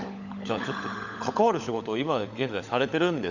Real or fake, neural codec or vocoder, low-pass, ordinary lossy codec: fake; codec, 16 kHz, 16 kbps, FunCodec, trained on LibriTTS, 50 frames a second; 7.2 kHz; none